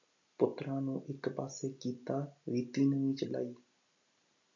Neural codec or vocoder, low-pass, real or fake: none; 7.2 kHz; real